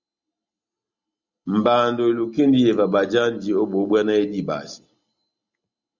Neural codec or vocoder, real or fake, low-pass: none; real; 7.2 kHz